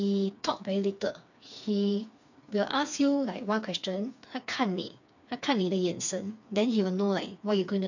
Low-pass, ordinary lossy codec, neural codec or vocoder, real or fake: 7.2 kHz; none; codec, 16 kHz, 4 kbps, FreqCodec, smaller model; fake